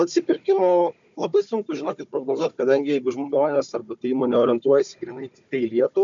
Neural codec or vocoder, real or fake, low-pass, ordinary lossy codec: codec, 16 kHz, 4 kbps, FunCodec, trained on Chinese and English, 50 frames a second; fake; 7.2 kHz; MP3, 64 kbps